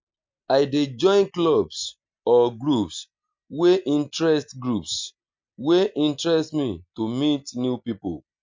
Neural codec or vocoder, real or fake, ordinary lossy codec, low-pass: none; real; MP3, 64 kbps; 7.2 kHz